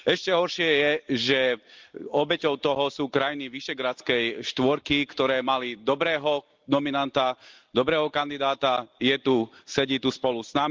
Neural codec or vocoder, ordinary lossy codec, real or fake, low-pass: none; Opus, 32 kbps; real; 7.2 kHz